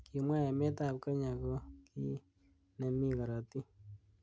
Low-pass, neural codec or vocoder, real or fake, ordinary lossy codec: none; none; real; none